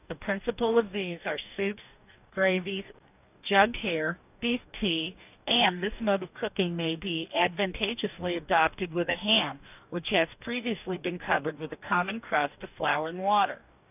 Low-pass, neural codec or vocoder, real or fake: 3.6 kHz; codec, 44.1 kHz, 2.6 kbps, DAC; fake